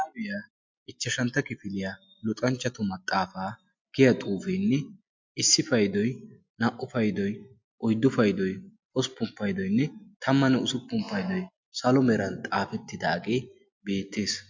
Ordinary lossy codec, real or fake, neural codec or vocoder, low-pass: MP3, 64 kbps; real; none; 7.2 kHz